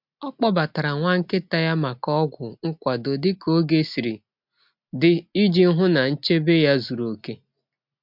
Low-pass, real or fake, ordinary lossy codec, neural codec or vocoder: 5.4 kHz; real; MP3, 48 kbps; none